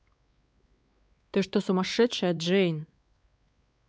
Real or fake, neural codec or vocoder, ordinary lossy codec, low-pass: fake; codec, 16 kHz, 4 kbps, X-Codec, WavLM features, trained on Multilingual LibriSpeech; none; none